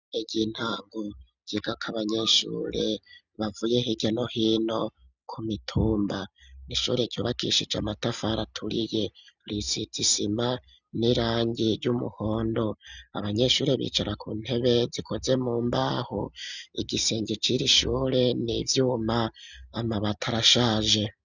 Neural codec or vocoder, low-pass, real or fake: none; 7.2 kHz; real